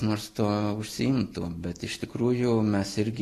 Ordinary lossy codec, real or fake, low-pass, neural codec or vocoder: AAC, 48 kbps; real; 14.4 kHz; none